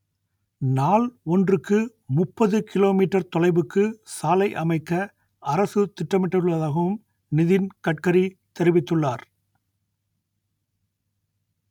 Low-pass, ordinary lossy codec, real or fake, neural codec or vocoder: 19.8 kHz; none; real; none